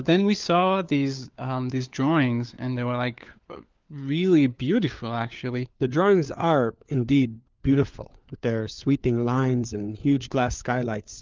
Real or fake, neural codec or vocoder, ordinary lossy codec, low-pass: fake; codec, 16 kHz, 4 kbps, FunCodec, trained on LibriTTS, 50 frames a second; Opus, 32 kbps; 7.2 kHz